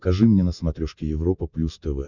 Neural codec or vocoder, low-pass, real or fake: none; 7.2 kHz; real